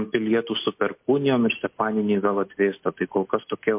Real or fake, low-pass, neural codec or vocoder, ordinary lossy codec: real; 3.6 kHz; none; MP3, 32 kbps